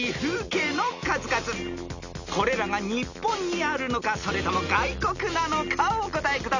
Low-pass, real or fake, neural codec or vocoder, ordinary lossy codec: 7.2 kHz; real; none; none